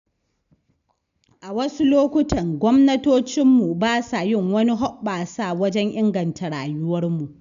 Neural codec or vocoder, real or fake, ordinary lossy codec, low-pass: none; real; none; 7.2 kHz